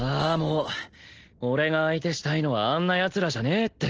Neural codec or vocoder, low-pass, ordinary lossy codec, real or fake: none; 7.2 kHz; Opus, 16 kbps; real